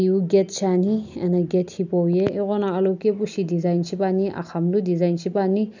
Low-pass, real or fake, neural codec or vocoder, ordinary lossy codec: 7.2 kHz; real; none; none